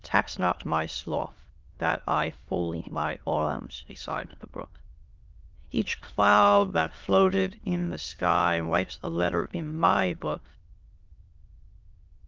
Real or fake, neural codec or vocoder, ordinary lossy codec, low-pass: fake; autoencoder, 22.05 kHz, a latent of 192 numbers a frame, VITS, trained on many speakers; Opus, 32 kbps; 7.2 kHz